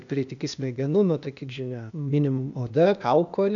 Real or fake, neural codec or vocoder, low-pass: fake; codec, 16 kHz, 0.8 kbps, ZipCodec; 7.2 kHz